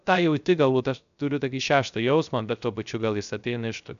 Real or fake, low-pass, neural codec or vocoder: fake; 7.2 kHz; codec, 16 kHz, 0.3 kbps, FocalCodec